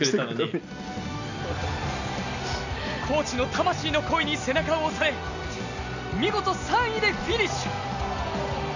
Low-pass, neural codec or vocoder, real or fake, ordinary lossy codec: 7.2 kHz; none; real; none